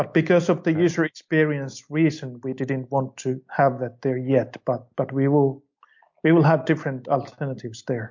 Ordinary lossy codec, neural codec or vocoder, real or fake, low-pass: MP3, 48 kbps; none; real; 7.2 kHz